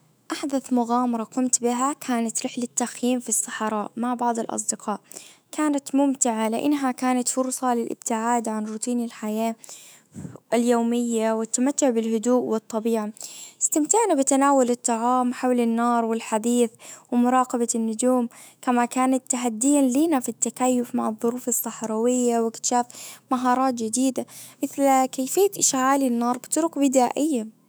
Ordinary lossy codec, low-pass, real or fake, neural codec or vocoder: none; none; fake; autoencoder, 48 kHz, 128 numbers a frame, DAC-VAE, trained on Japanese speech